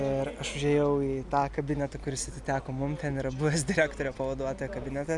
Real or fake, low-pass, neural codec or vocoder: real; 10.8 kHz; none